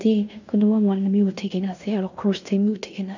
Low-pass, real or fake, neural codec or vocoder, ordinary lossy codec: 7.2 kHz; fake; codec, 16 kHz in and 24 kHz out, 0.9 kbps, LongCat-Audio-Codec, fine tuned four codebook decoder; none